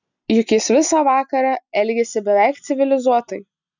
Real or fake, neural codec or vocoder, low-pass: real; none; 7.2 kHz